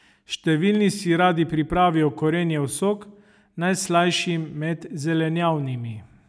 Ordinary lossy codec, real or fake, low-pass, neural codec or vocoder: none; real; none; none